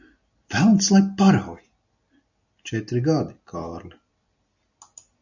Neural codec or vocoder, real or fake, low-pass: none; real; 7.2 kHz